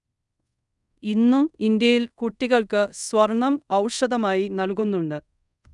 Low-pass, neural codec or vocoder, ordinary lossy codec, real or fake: 10.8 kHz; codec, 24 kHz, 0.5 kbps, DualCodec; none; fake